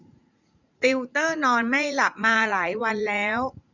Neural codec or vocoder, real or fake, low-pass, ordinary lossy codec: vocoder, 44.1 kHz, 80 mel bands, Vocos; fake; 7.2 kHz; none